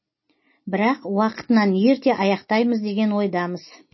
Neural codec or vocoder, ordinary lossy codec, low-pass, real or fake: none; MP3, 24 kbps; 7.2 kHz; real